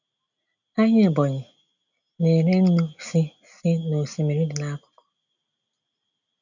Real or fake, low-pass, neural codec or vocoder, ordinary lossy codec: real; 7.2 kHz; none; none